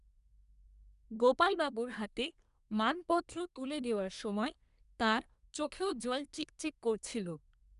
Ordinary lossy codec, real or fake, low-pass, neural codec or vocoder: none; fake; 10.8 kHz; codec, 24 kHz, 1 kbps, SNAC